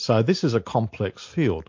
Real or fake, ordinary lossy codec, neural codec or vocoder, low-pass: real; MP3, 48 kbps; none; 7.2 kHz